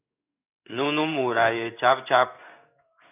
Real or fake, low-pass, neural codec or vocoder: fake; 3.6 kHz; codec, 16 kHz in and 24 kHz out, 1 kbps, XY-Tokenizer